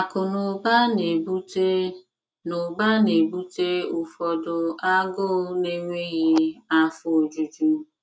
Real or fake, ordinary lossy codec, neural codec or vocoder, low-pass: real; none; none; none